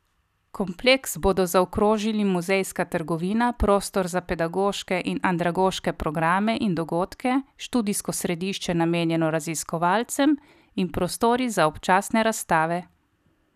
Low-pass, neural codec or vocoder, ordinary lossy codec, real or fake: 14.4 kHz; none; none; real